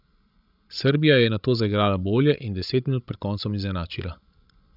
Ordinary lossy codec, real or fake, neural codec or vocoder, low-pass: none; fake; codec, 16 kHz, 16 kbps, FreqCodec, larger model; 5.4 kHz